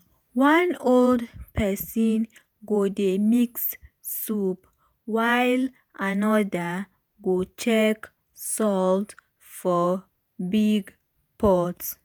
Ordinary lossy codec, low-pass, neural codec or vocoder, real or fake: none; none; vocoder, 48 kHz, 128 mel bands, Vocos; fake